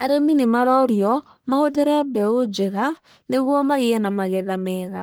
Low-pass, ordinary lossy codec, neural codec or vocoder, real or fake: none; none; codec, 44.1 kHz, 1.7 kbps, Pupu-Codec; fake